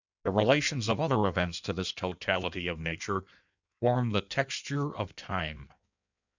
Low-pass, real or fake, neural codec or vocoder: 7.2 kHz; fake; codec, 16 kHz in and 24 kHz out, 1.1 kbps, FireRedTTS-2 codec